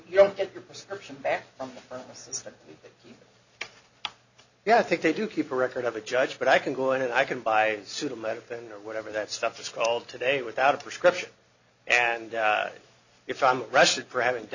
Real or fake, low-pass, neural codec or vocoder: real; 7.2 kHz; none